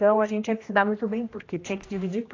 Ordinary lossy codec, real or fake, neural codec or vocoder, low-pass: AAC, 32 kbps; fake; codec, 16 kHz, 1 kbps, X-Codec, HuBERT features, trained on general audio; 7.2 kHz